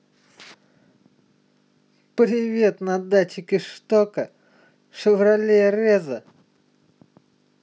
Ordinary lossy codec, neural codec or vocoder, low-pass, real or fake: none; none; none; real